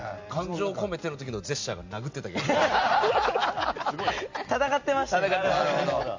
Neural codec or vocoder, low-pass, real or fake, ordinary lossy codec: none; 7.2 kHz; real; MP3, 48 kbps